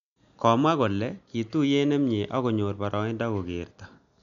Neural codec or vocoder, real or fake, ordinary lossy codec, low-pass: none; real; none; 7.2 kHz